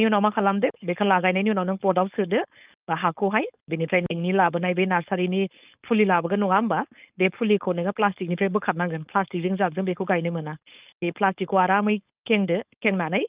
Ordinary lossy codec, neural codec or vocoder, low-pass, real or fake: Opus, 32 kbps; codec, 16 kHz, 4.8 kbps, FACodec; 3.6 kHz; fake